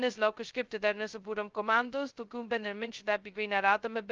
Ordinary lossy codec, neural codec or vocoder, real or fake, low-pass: Opus, 24 kbps; codec, 16 kHz, 0.2 kbps, FocalCodec; fake; 7.2 kHz